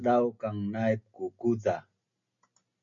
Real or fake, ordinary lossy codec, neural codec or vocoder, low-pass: real; MP3, 96 kbps; none; 7.2 kHz